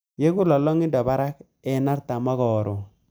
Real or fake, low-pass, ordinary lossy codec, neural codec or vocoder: real; none; none; none